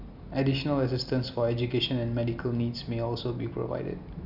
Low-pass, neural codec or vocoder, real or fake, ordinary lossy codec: 5.4 kHz; none; real; none